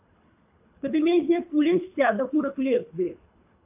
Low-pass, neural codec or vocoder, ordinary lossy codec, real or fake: 3.6 kHz; codec, 24 kHz, 3 kbps, HILCodec; AAC, 32 kbps; fake